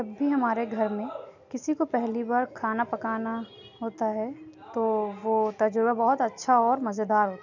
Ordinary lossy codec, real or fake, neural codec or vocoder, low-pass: none; real; none; 7.2 kHz